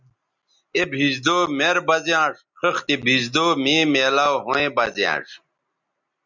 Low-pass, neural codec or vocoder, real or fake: 7.2 kHz; none; real